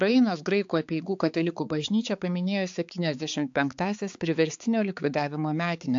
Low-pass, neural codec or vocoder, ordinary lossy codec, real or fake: 7.2 kHz; codec, 16 kHz, 4 kbps, X-Codec, HuBERT features, trained on balanced general audio; AAC, 64 kbps; fake